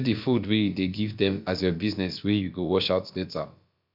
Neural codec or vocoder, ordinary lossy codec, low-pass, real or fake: codec, 16 kHz, about 1 kbps, DyCAST, with the encoder's durations; MP3, 48 kbps; 5.4 kHz; fake